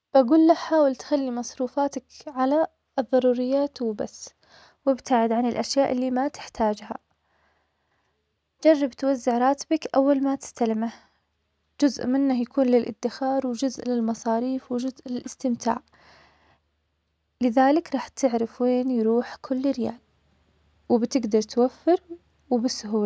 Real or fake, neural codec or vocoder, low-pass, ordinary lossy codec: real; none; none; none